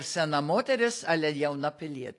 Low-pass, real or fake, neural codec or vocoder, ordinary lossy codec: 10.8 kHz; real; none; AAC, 48 kbps